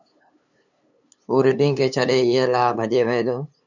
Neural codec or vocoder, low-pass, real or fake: codec, 16 kHz, 8 kbps, FunCodec, trained on LibriTTS, 25 frames a second; 7.2 kHz; fake